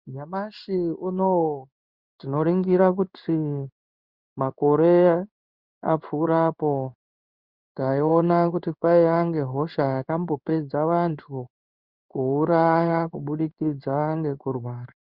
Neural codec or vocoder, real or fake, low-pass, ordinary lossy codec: codec, 16 kHz in and 24 kHz out, 1 kbps, XY-Tokenizer; fake; 5.4 kHz; Opus, 64 kbps